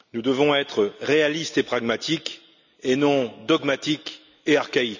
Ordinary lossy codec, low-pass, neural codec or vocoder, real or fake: none; 7.2 kHz; none; real